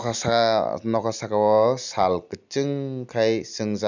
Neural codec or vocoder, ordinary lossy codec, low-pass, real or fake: none; none; 7.2 kHz; real